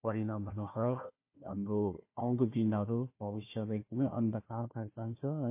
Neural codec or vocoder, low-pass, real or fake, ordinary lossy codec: codec, 16 kHz, 1 kbps, FunCodec, trained on Chinese and English, 50 frames a second; 3.6 kHz; fake; MP3, 24 kbps